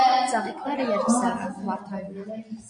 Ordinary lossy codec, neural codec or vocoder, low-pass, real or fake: AAC, 64 kbps; none; 9.9 kHz; real